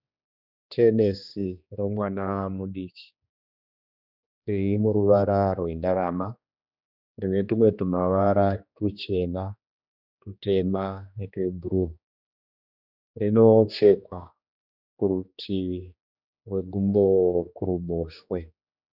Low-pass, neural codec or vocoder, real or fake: 5.4 kHz; codec, 16 kHz, 2 kbps, X-Codec, HuBERT features, trained on general audio; fake